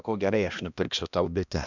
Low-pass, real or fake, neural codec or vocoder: 7.2 kHz; fake; codec, 16 kHz, 1 kbps, X-Codec, HuBERT features, trained on balanced general audio